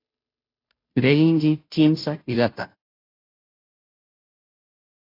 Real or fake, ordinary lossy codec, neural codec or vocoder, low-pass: fake; AAC, 32 kbps; codec, 16 kHz, 0.5 kbps, FunCodec, trained on Chinese and English, 25 frames a second; 5.4 kHz